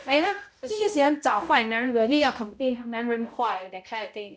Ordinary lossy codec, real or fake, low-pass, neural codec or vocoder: none; fake; none; codec, 16 kHz, 0.5 kbps, X-Codec, HuBERT features, trained on balanced general audio